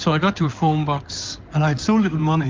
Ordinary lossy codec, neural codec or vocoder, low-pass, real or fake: Opus, 24 kbps; codec, 16 kHz in and 24 kHz out, 2.2 kbps, FireRedTTS-2 codec; 7.2 kHz; fake